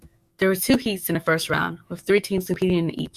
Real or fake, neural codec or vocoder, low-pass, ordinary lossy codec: fake; autoencoder, 48 kHz, 128 numbers a frame, DAC-VAE, trained on Japanese speech; 14.4 kHz; Opus, 64 kbps